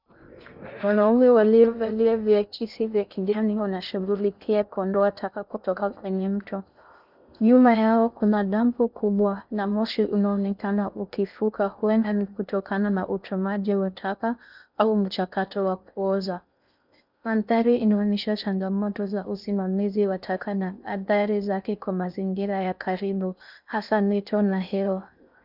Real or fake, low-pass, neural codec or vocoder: fake; 5.4 kHz; codec, 16 kHz in and 24 kHz out, 0.6 kbps, FocalCodec, streaming, 2048 codes